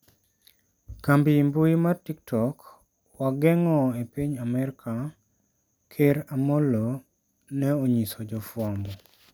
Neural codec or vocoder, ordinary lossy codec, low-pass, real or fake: none; none; none; real